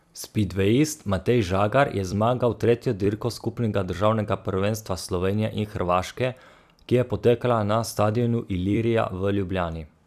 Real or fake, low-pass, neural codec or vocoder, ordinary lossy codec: fake; 14.4 kHz; vocoder, 44.1 kHz, 128 mel bands every 256 samples, BigVGAN v2; none